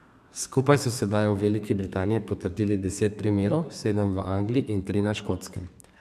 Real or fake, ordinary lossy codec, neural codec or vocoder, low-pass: fake; none; codec, 32 kHz, 1.9 kbps, SNAC; 14.4 kHz